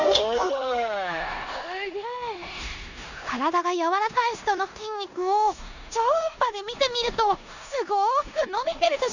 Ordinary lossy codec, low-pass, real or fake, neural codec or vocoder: none; 7.2 kHz; fake; codec, 16 kHz in and 24 kHz out, 0.9 kbps, LongCat-Audio-Codec, four codebook decoder